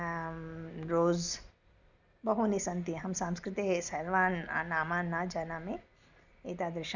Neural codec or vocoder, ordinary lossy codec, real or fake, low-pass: none; none; real; 7.2 kHz